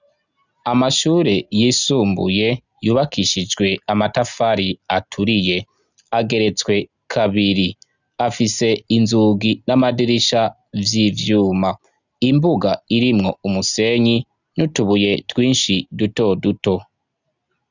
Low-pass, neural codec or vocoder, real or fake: 7.2 kHz; none; real